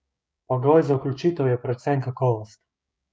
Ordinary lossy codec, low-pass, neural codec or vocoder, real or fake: none; none; codec, 16 kHz, 6 kbps, DAC; fake